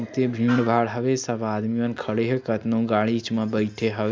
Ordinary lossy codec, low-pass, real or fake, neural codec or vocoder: none; none; real; none